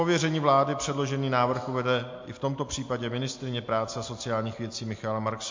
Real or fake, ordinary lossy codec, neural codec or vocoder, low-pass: real; MP3, 48 kbps; none; 7.2 kHz